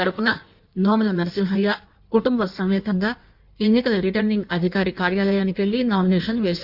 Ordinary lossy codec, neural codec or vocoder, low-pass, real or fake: Opus, 64 kbps; codec, 16 kHz in and 24 kHz out, 1.1 kbps, FireRedTTS-2 codec; 5.4 kHz; fake